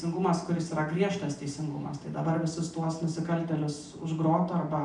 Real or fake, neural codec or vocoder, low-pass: real; none; 10.8 kHz